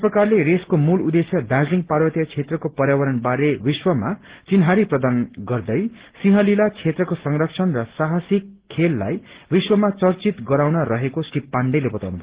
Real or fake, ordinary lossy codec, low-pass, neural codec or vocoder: real; Opus, 16 kbps; 3.6 kHz; none